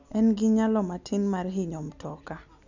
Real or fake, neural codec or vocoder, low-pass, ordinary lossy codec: real; none; 7.2 kHz; none